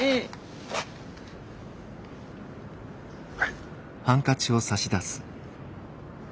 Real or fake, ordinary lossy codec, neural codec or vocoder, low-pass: real; none; none; none